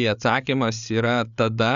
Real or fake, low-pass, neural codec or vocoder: fake; 7.2 kHz; codec, 16 kHz, 8 kbps, FreqCodec, larger model